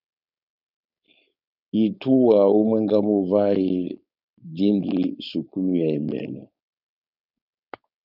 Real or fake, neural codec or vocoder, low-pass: fake; codec, 16 kHz, 4.8 kbps, FACodec; 5.4 kHz